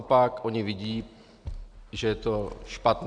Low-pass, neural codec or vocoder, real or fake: 9.9 kHz; none; real